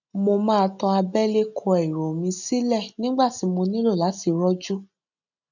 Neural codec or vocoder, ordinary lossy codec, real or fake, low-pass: none; none; real; 7.2 kHz